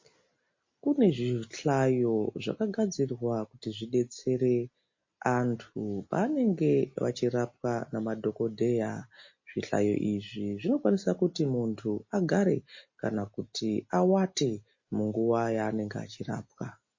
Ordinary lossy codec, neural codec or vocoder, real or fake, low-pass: MP3, 32 kbps; none; real; 7.2 kHz